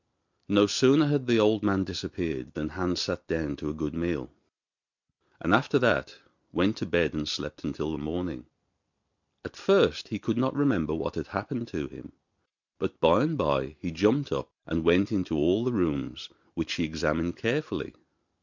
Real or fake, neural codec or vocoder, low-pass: real; none; 7.2 kHz